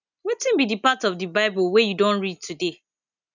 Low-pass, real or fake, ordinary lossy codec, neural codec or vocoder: 7.2 kHz; real; none; none